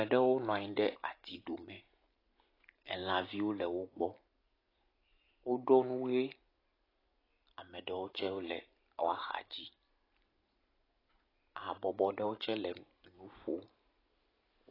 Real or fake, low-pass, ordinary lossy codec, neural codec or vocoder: real; 5.4 kHz; AAC, 24 kbps; none